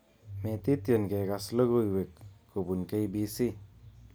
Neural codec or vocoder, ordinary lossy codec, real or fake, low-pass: none; none; real; none